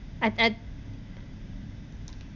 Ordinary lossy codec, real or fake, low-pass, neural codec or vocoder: none; real; 7.2 kHz; none